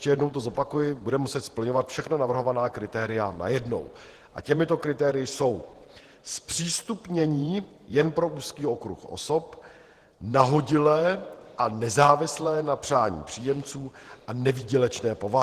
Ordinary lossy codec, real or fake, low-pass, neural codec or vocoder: Opus, 16 kbps; fake; 14.4 kHz; vocoder, 48 kHz, 128 mel bands, Vocos